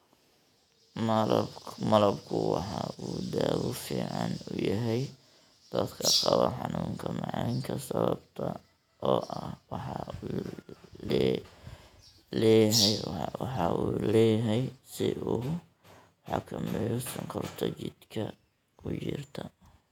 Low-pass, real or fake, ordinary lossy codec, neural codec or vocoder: 19.8 kHz; fake; none; vocoder, 48 kHz, 128 mel bands, Vocos